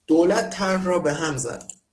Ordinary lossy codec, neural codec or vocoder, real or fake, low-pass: Opus, 16 kbps; codec, 44.1 kHz, 7.8 kbps, DAC; fake; 10.8 kHz